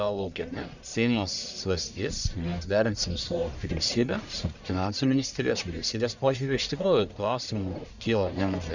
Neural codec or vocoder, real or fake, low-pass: codec, 44.1 kHz, 1.7 kbps, Pupu-Codec; fake; 7.2 kHz